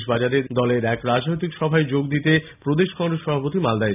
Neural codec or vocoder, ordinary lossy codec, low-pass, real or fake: none; none; 3.6 kHz; real